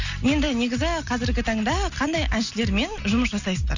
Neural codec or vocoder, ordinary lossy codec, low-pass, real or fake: none; none; 7.2 kHz; real